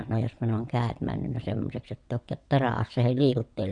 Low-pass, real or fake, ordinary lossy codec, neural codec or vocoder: 9.9 kHz; fake; none; vocoder, 22.05 kHz, 80 mel bands, WaveNeXt